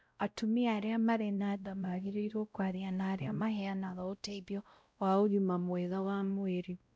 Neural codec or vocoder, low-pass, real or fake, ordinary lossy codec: codec, 16 kHz, 0.5 kbps, X-Codec, WavLM features, trained on Multilingual LibriSpeech; none; fake; none